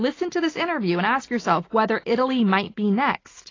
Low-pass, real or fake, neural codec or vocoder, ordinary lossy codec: 7.2 kHz; real; none; AAC, 32 kbps